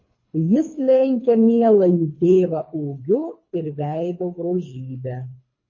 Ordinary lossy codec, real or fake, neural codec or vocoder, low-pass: MP3, 32 kbps; fake; codec, 24 kHz, 3 kbps, HILCodec; 7.2 kHz